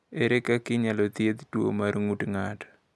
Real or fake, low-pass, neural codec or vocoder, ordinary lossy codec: real; none; none; none